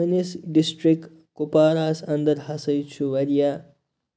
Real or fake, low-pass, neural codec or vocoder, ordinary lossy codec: real; none; none; none